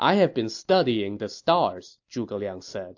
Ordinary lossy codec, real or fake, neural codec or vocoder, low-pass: AAC, 48 kbps; real; none; 7.2 kHz